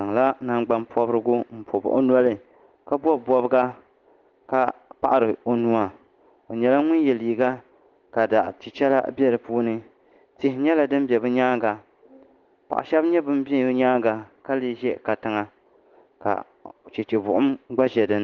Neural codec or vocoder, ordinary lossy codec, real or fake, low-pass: none; Opus, 16 kbps; real; 7.2 kHz